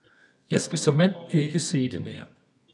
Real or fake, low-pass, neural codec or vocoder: fake; 10.8 kHz; codec, 24 kHz, 0.9 kbps, WavTokenizer, medium music audio release